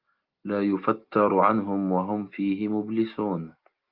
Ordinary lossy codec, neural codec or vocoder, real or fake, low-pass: Opus, 32 kbps; none; real; 5.4 kHz